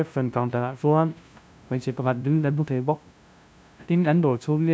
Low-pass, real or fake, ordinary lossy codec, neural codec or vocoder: none; fake; none; codec, 16 kHz, 0.5 kbps, FunCodec, trained on LibriTTS, 25 frames a second